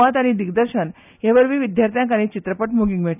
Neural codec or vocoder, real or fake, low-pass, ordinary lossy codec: none; real; 3.6 kHz; none